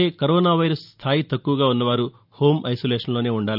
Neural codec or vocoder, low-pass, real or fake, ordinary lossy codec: none; 5.4 kHz; real; none